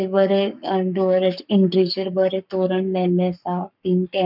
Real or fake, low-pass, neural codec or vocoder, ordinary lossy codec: fake; 5.4 kHz; codec, 44.1 kHz, 7.8 kbps, Pupu-Codec; none